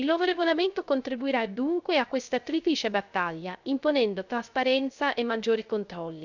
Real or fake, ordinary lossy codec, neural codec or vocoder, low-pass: fake; none; codec, 16 kHz, 0.3 kbps, FocalCodec; 7.2 kHz